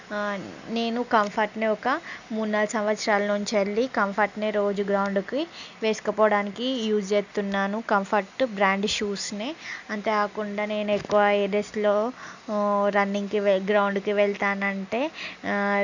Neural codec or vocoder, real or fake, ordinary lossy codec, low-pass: none; real; none; 7.2 kHz